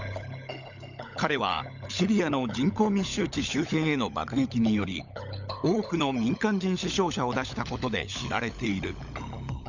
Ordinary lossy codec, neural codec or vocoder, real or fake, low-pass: none; codec, 16 kHz, 16 kbps, FunCodec, trained on LibriTTS, 50 frames a second; fake; 7.2 kHz